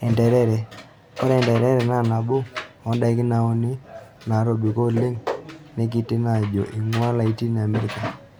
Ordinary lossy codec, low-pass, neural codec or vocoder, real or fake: none; none; none; real